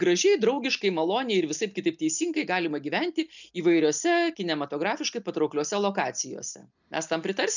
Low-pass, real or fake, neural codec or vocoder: 7.2 kHz; real; none